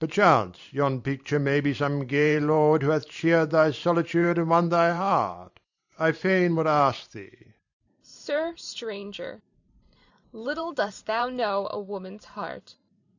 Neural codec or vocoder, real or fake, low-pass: vocoder, 44.1 kHz, 128 mel bands every 512 samples, BigVGAN v2; fake; 7.2 kHz